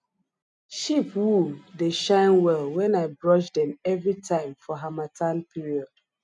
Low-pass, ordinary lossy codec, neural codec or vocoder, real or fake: 10.8 kHz; none; none; real